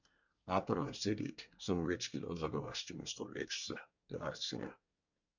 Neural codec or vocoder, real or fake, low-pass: codec, 24 kHz, 1 kbps, SNAC; fake; 7.2 kHz